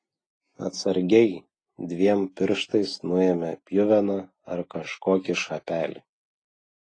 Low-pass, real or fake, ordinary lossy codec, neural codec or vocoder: 9.9 kHz; real; AAC, 32 kbps; none